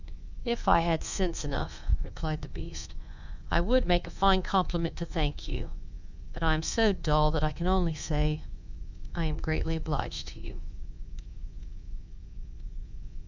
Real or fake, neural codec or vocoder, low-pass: fake; codec, 24 kHz, 3.1 kbps, DualCodec; 7.2 kHz